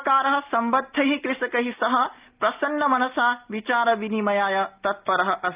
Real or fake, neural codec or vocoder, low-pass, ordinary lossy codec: real; none; 3.6 kHz; Opus, 32 kbps